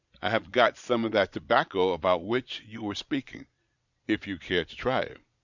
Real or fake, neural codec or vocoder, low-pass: fake; vocoder, 22.05 kHz, 80 mel bands, Vocos; 7.2 kHz